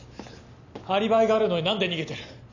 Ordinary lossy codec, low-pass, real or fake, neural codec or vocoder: none; 7.2 kHz; real; none